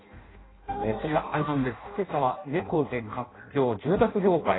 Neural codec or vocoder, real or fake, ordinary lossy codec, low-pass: codec, 16 kHz in and 24 kHz out, 0.6 kbps, FireRedTTS-2 codec; fake; AAC, 16 kbps; 7.2 kHz